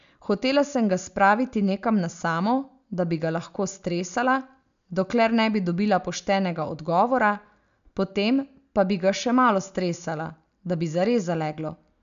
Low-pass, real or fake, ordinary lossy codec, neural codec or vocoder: 7.2 kHz; real; AAC, 96 kbps; none